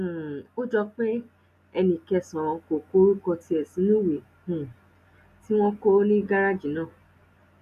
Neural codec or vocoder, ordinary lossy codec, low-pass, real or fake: none; none; 14.4 kHz; real